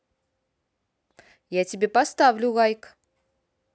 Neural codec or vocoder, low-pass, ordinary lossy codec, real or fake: none; none; none; real